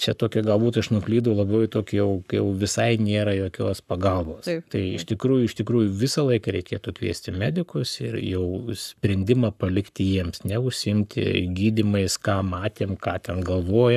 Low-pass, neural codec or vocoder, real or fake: 14.4 kHz; codec, 44.1 kHz, 7.8 kbps, Pupu-Codec; fake